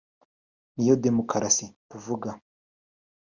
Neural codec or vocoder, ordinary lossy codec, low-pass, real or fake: none; Opus, 64 kbps; 7.2 kHz; real